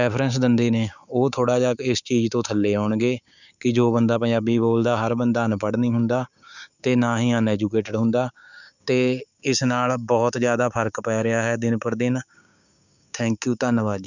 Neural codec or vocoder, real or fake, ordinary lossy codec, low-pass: codec, 16 kHz, 6 kbps, DAC; fake; none; 7.2 kHz